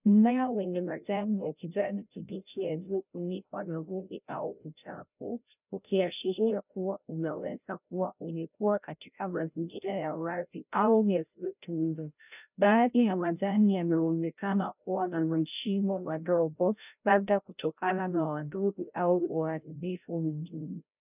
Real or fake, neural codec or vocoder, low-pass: fake; codec, 16 kHz, 0.5 kbps, FreqCodec, larger model; 3.6 kHz